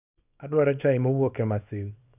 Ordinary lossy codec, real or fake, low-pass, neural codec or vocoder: none; fake; 3.6 kHz; codec, 24 kHz, 0.9 kbps, WavTokenizer, small release